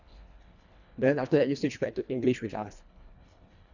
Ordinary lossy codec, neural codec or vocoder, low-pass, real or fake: none; codec, 24 kHz, 1.5 kbps, HILCodec; 7.2 kHz; fake